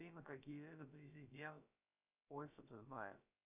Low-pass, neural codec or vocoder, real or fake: 3.6 kHz; codec, 16 kHz, about 1 kbps, DyCAST, with the encoder's durations; fake